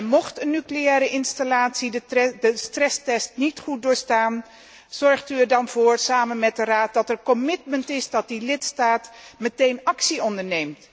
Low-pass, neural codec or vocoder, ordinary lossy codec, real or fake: none; none; none; real